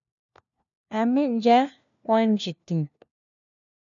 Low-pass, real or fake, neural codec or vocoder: 7.2 kHz; fake; codec, 16 kHz, 1 kbps, FunCodec, trained on LibriTTS, 50 frames a second